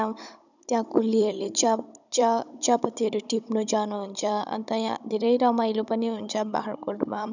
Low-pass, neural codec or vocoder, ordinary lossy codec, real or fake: 7.2 kHz; codec, 16 kHz, 16 kbps, FunCodec, trained on Chinese and English, 50 frames a second; none; fake